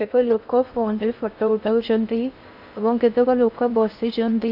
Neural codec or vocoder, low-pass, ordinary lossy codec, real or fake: codec, 16 kHz in and 24 kHz out, 0.6 kbps, FocalCodec, streaming, 2048 codes; 5.4 kHz; none; fake